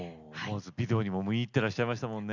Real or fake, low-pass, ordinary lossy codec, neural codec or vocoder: real; 7.2 kHz; none; none